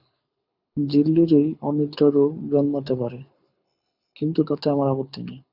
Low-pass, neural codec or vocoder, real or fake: 5.4 kHz; codec, 24 kHz, 6 kbps, HILCodec; fake